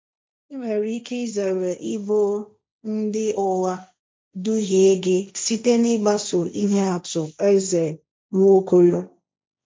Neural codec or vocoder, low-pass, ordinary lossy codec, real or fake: codec, 16 kHz, 1.1 kbps, Voila-Tokenizer; none; none; fake